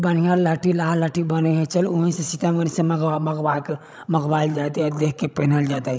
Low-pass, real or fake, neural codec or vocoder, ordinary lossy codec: none; fake; codec, 16 kHz, 16 kbps, FreqCodec, larger model; none